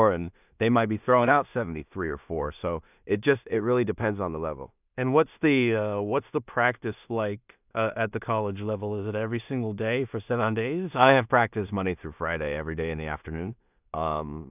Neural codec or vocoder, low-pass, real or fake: codec, 16 kHz in and 24 kHz out, 0.4 kbps, LongCat-Audio-Codec, two codebook decoder; 3.6 kHz; fake